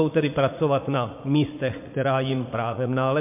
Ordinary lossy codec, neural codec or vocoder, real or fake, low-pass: MP3, 32 kbps; vocoder, 44.1 kHz, 80 mel bands, Vocos; fake; 3.6 kHz